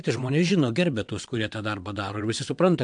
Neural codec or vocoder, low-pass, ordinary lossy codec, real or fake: vocoder, 22.05 kHz, 80 mel bands, WaveNeXt; 9.9 kHz; MP3, 64 kbps; fake